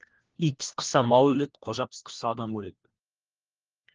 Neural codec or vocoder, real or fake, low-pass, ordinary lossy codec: codec, 16 kHz, 1 kbps, FunCodec, trained on LibriTTS, 50 frames a second; fake; 7.2 kHz; Opus, 16 kbps